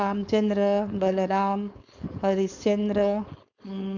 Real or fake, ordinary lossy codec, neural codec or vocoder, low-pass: fake; none; codec, 16 kHz, 4.8 kbps, FACodec; 7.2 kHz